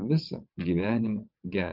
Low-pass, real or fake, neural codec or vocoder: 5.4 kHz; fake; vocoder, 22.05 kHz, 80 mel bands, WaveNeXt